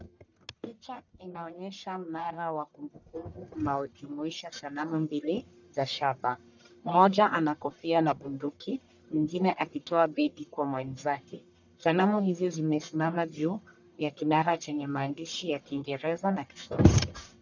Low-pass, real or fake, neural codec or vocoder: 7.2 kHz; fake; codec, 44.1 kHz, 1.7 kbps, Pupu-Codec